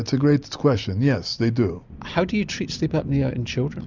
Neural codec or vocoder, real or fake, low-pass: none; real; 7.2 kHz